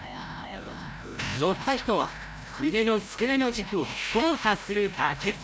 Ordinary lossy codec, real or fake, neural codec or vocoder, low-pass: none; fake; codec, 16 kHz, 0.5 kbps, FreqCodec, larger model; none